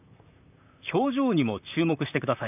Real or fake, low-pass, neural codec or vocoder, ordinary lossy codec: fake; 3.6 kHz; vocoder, 44.1 kHz, 128 mel bands, Pupu-Vocoder; none